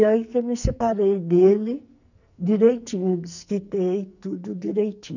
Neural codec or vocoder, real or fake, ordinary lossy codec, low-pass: codec, 44.1 kHz, 2.6 kbps, SNAC; fake; none; 7.2 kHz